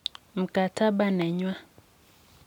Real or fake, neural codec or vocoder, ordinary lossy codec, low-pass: real; none; none; 19.8 kHz